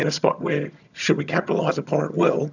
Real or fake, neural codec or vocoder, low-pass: fake; vocoder, 22.05 kHz, 80 mel bands, HiFi-GAN; 7.2 kHz